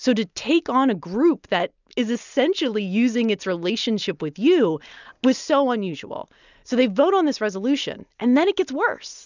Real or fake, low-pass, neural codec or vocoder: real; 7.2 kHz; none